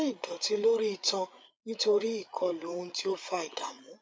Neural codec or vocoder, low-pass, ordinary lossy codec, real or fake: codec, 16 kHz, 8 kbps, FreqCodec, larger model; none; none; fake